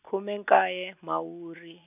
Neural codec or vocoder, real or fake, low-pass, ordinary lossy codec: none; real; 3.6 kHz; none